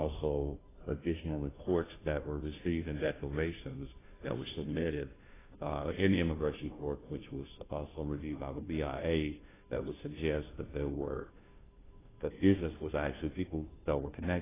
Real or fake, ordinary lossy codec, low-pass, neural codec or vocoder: fake; AAC, 16 kbps; 3.6 kHz; codec, 16 kHz, 0.5 kbps, FunCodec, trained on Chinese and English, 25 frames a second